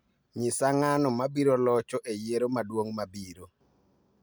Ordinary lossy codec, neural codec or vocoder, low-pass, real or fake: none; none; none; real